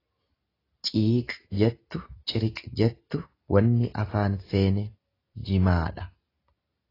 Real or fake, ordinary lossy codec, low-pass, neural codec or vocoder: real; AAC, 24 kbps; 5.4 kHz; none